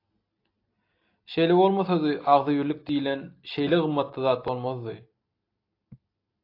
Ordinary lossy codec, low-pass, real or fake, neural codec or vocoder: AAC, 32 kbps; 5.4 kHz; real; none